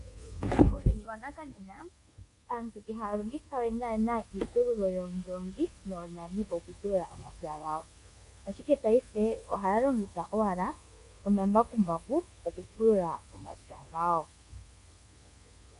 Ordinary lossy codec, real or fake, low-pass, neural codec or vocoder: MP3, 48 kbps; fake; 10.8 kHz; codec, 24 kHz, 1.2 kbps, DualCodec